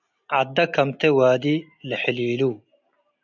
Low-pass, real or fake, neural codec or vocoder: 7.2 kHz; real; none